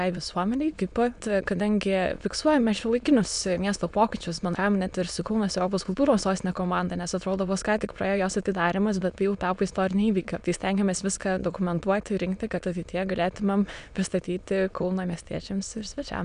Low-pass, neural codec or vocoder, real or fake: 9.9 kHz; autoencoder, 22.05 kHz, a latent of 192 numbers a frame, VITS, trained on many speakers; fake